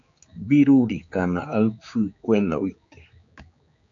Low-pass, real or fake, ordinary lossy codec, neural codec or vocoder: 7.2 kHz; fake; AAC, 64 kbps; codec, 16 kHz, 4 kbps, X-Codec, HuBERT features, trained on general audio